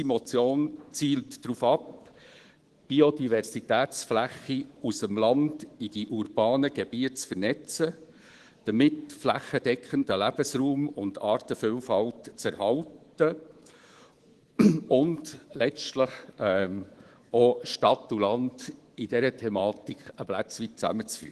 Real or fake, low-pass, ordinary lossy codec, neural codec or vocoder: fake; 10.8 kHz; Opus, 16 kbps; codec, 24 kHz, 3.1 kbps, DualCodec